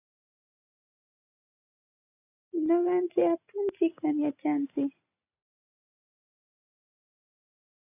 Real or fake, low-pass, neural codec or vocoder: real; 3.6 kHz; none